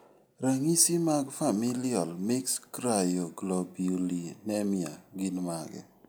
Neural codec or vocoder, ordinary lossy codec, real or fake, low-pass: none; none; real; none